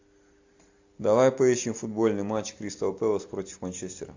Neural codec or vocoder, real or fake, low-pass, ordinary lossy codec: none; real; 7.2 kHz; AAC, 48 kbps